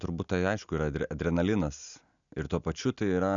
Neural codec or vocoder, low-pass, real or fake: none; 7.2 kHz; real